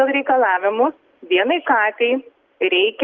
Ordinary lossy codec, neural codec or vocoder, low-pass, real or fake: Opus, 24 kbps; none; 7.2 kHz; real